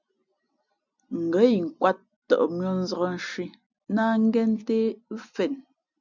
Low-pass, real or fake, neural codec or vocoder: 7.2 kHz; real; none